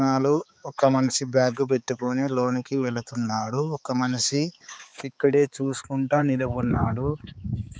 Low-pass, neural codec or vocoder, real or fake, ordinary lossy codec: none; codec, 16 kHz, 4 kbps, X-Codec, HuBERT features, trained on balanced general audio; fake; none